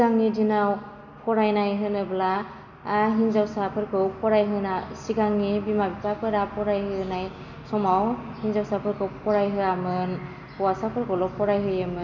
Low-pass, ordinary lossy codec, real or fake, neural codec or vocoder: 7.2 kHz; none; real; none